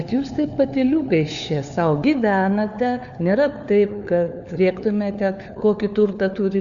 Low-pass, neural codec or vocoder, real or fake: 7.2 kHz; codec, 16 kHz, 4 kbps, FunCodec, trained on LibriTTS, 50 frames a second; fake